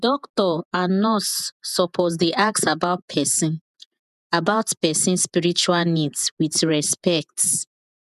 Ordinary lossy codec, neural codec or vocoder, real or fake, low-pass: none; vocoder, 48 kHz, 128 mel bands, Vocos; fake; 14.4 kHz